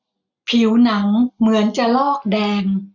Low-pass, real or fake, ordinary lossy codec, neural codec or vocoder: 7.2 kHz; real; none; none